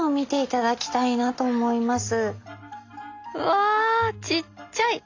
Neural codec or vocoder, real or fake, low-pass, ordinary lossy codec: none; real; 7.2 kHz; AAC, 48 kbps